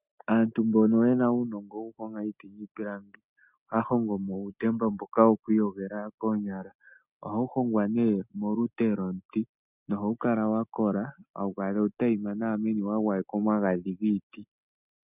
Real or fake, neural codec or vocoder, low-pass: real; none; 3.6 kHz